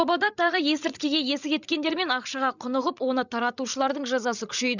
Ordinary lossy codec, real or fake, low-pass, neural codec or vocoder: none; fake; 7.2 kHz; codec, 16 kHz, 16 kbps, FreqCodec, larger model